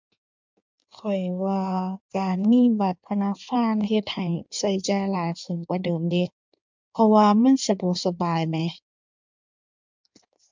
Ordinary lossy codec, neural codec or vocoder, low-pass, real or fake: MP3, 64 kbps; codec, 16 kHz in and 24 kHz out, 1.1 kbps, FireRedTTS-2 codec; 7.2 kHz; fake